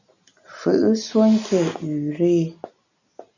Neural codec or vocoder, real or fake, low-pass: none; real; 7.2 kHz